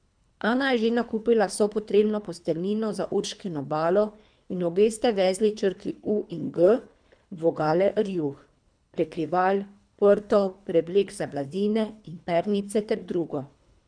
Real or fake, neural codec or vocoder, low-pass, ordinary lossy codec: fake; codec, 24 kHz, 3 kbps, HILCodec; 9.9 kHz; MP3, 96 kbps